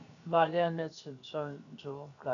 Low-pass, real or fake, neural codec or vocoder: 7.2 kHz; fake; codec, 16 kHz, 0.7 kbps, FocalCodec